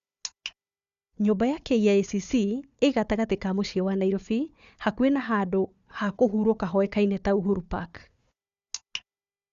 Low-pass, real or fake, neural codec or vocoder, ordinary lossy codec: 7.2 kHz; fake; codec, 16 kHz, 4 kbps, FunCodec, trained on Chinese and English, 50 frames a second; Opus, 64 kbps